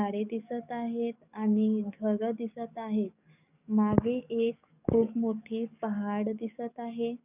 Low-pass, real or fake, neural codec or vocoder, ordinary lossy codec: 3.6 kHz; fake; codec, 44.1 kHz, 7.8 kbps, DAC; none